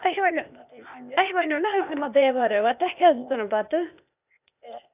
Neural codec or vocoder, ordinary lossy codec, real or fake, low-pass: codec, 16 kHz, 0.8 kbps, ZipCodec; none; fake; 3.6 kHz